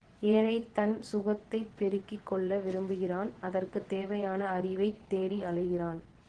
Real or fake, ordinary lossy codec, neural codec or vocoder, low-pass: fake; Opus, 16 kbps; vocoder, 22.05 kHz, 80 mel bands, WaveNeXt; 9.9 kHz